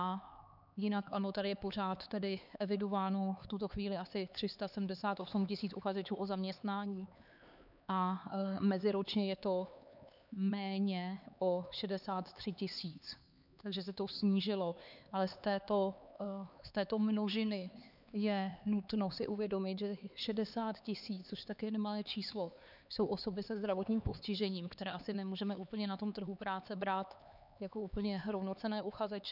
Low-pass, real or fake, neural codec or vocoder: 5.4 kHz; fake; codec, 16 kHz, 4 kbps, X-Codec, HuBERT features, trained on LibriSpeech